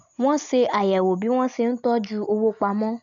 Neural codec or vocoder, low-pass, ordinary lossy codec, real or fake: none; 7.2 kHz; none; real